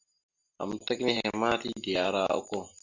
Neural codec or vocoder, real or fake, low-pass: none; real; 7.2 kHz